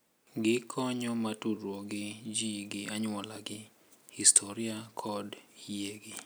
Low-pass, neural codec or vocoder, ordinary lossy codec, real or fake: none; none; none; real